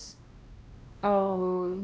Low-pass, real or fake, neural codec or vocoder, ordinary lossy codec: none; fake; codec, 16 kHz, 1 kbps, X-Codec, WavLM features, trained on Multilingual LibriSpeech; none